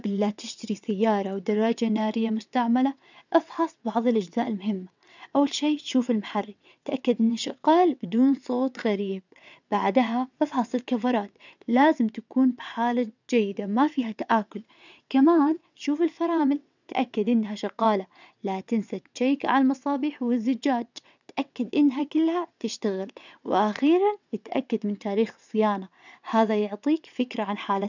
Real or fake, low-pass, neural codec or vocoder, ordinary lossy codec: fake; 7.2 kHz; vocoder, 22.05 kHz, 80 mel bands, WaveNeXt; none